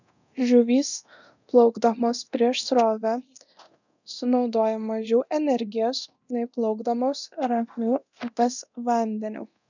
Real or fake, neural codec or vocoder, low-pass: fake; codec, 24 kHz, 0.9 kbps, DualCodec; 7.2 kHz